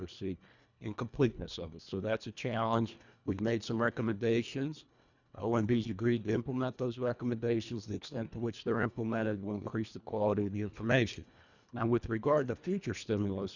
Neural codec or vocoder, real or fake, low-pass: codec, 24 kHz, 1.5 kbps, HILCodec; fake; 7.2 kHz